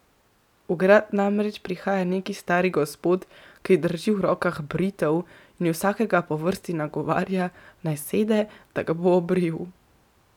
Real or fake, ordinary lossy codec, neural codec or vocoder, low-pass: real; none; none; 19.8 kHz